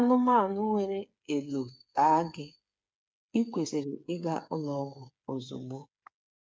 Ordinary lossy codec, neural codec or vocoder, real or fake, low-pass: none; codec, 16 kHz, 8 kbps, FreqCodec, smaller model; fake; none